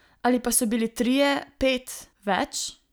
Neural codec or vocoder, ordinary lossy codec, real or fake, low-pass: none; none; real; none